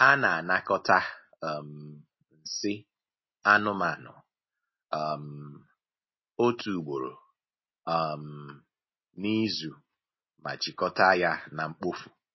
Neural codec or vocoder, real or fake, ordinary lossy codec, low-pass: none; real; MP3, 24 kbps; 7.2 kHz